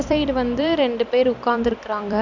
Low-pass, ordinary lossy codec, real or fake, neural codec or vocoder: 7.2 kHz; none; fake; vocoder, 44.1 kHz, 128 mel bands every 256 samples, BigVGAN v2